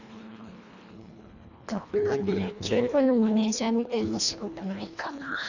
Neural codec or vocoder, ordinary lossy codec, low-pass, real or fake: codec, 24 kHz, 1.5 kbps, HILCodec; none; 7.2 kHz; fake